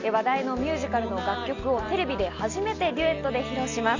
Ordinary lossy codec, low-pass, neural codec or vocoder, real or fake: Opus, 64 kbps; 7.2 kHz; none; real